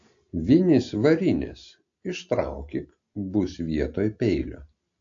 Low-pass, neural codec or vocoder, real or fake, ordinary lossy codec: 7.2 kHz; none; real; AAC, 48 kbps